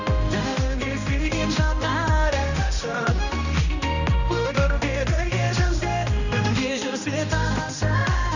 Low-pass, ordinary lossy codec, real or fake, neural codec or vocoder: 7.2 kHz; none; fake; codec, 16 kHz, 1 kbps, X-Codec, HuBERT features, trained on general audio